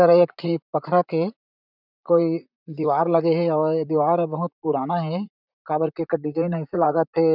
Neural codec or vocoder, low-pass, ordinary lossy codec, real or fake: vocoder, 44.1 kHz, 128 mel bands, Pupu-Vocoder; 5.4 kHz; none; fake